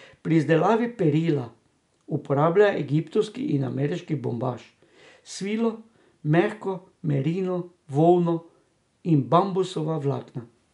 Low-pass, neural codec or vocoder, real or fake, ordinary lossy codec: 10.8 kHz; none; real; none